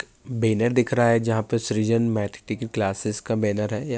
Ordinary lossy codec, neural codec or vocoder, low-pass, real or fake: none; none; none; real